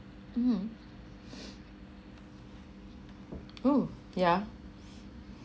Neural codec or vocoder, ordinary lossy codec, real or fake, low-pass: none; none; real; none